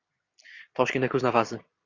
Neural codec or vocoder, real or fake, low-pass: none; real; 7.2 kHz